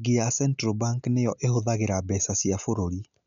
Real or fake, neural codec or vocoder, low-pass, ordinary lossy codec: real; none; 7.2 kHz; MP3, 96 kbps